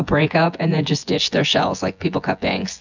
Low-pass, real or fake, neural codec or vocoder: 7.2 kHz; fake; vocoder, 24 kHz, 100 mel bands, Vocos